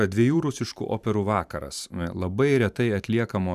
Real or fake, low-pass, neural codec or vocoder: fake; 14.4 kHz; vocoder, 44.1 kHz, 128 mel bands every 512 samples, BigVGAN v2